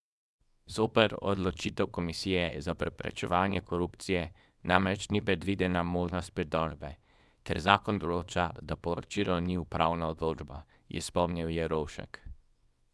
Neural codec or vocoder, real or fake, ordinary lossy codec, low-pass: codec, 24 kHz, 0.9 kbps, WavTokenizer, small release; fake; none; none